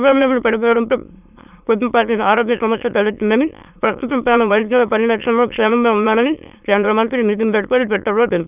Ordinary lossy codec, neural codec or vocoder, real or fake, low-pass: none; autoencoder, 22.05 kHz, a latent of 192 numbers a frame, VITS, trained on many speakers; fake; 3.6 kHz